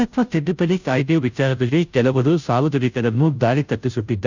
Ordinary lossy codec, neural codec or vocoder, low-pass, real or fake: none; codec, 16 kHz, 0.5 kbps, FunCodec, trained on Chinese and English, 25 frames a second; 7.2 kHz; fake